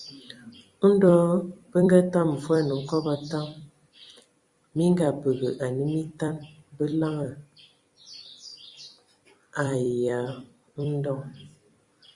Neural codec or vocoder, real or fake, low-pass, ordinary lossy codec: vocoder, 44.1 kHz, 128 mel bands every 256 samples, BigVGAN v2; fake; 10.8 kHz; Opus, 64 kbps